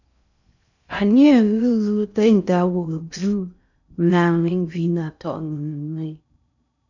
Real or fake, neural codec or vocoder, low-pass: fake; codec, 16 kHz in and 24 kHz out, 0.6 kbps, FocalCodec, streaming, 2048 codes; 7.2 kHz